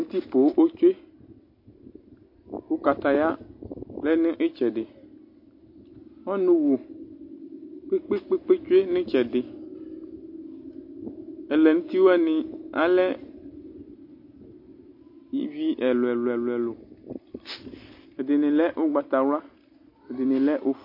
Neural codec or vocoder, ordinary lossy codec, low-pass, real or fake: none; MP3, 32 kbps; 5.4 kHz; real